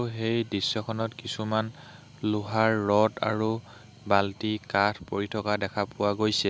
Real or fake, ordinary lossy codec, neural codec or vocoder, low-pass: real; none; none; none